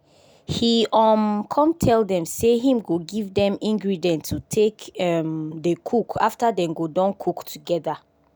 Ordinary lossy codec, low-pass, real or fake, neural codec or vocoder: none; none; real; none